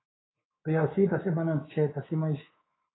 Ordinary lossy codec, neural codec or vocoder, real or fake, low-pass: AAC, 16 kbps; codec, 24 kHz, 3.1 kbps, DualCodec; fake; 7.2 kHz